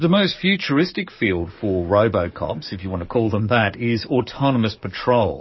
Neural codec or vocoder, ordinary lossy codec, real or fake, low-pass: codec, 44.1 kHz, 7.8 kbps, DAC; MP3, 24 kbps; fake; 7.2 kHz